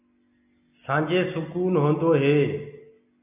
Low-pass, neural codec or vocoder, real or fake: 3.6 kHz; none; real